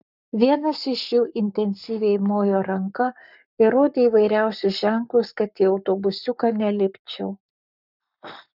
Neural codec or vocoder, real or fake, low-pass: codec, 44.1 kHz, 7.8 kbps, Pupu-Codec; fake; 5.4 kHz